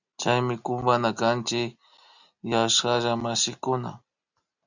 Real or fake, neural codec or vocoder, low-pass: real; none; 7.2 kHz